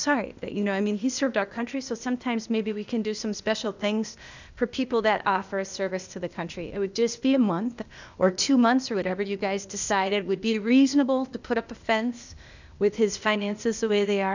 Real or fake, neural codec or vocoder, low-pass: fake; codec, 16 kHz, 0.8 kbps, ZipCodec; 7.2 kHz